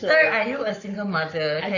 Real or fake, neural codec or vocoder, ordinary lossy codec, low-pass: fake; codec, 44.1 kHz, 7.8 kbps, Pupu-Codec; none; 7.2 kHz